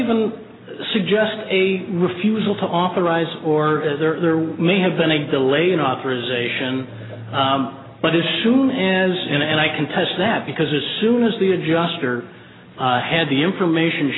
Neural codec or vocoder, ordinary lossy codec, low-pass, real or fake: none; AAC, 16 kbps; 7.2 kHz; real